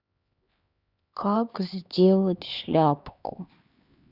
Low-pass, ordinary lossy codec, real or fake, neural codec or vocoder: 5.4 kHz; Opus, 64 kbps; fake; codec, 16 kHz, 2 kbps, X-Codec, HuBERT features, trained on LibriSpeech